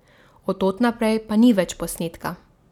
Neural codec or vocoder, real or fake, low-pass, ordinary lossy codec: none; real; 19.8 kHz; none